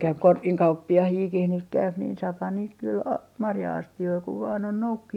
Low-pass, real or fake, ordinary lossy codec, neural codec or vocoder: 19.8 kHz; real; none; none